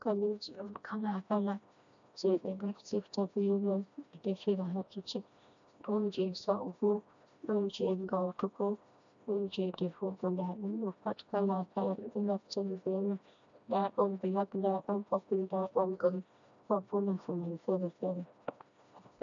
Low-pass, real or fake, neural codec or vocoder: 7.2 kHz; fake; codec, 16 kHz, 1 kbps, FreqCodec, smaller model